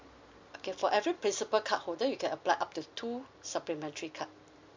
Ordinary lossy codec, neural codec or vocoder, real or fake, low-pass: MP3, 64 kbps; none; real; 7.2 kHz